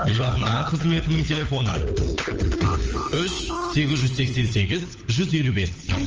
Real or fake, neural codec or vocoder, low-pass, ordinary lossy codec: fake; codec, 16 kHz, 4 kbps, FunCodec, trained on LibriTTS, 50 frames a second; 7.2 kHz; Opus, 24 kbps